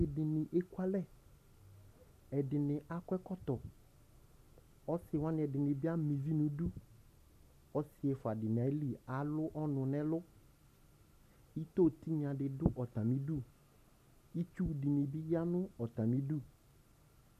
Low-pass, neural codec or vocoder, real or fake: 14.4 kHz; none; real